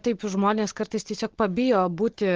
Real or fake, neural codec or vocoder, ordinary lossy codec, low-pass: real; none; Opus, 16 kbps; 7.2 kHz